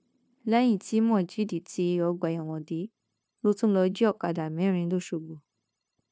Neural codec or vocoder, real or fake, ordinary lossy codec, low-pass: codec, 16 kHz, 0.9 kbps, LongCat-Audio-Codec; fake; none; none